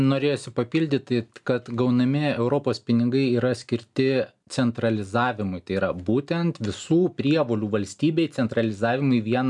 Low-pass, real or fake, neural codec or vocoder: 10.8 kHz; real; none